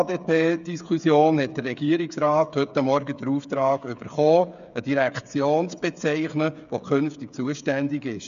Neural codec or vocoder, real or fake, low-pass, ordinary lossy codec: codec, 16 kHz, 8 kbps, FreqCodec, smaller model; fake; 7.2 kHz; none